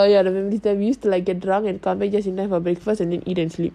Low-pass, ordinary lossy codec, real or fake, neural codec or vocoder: 14.4 kHz; AAC, 96 kbps; real; none